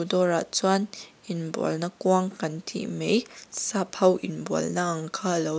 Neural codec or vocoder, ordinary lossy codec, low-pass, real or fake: none; none; none; real